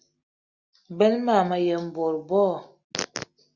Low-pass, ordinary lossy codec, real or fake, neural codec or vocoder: 7.2 kHz; Opus, 64 kbps; real; none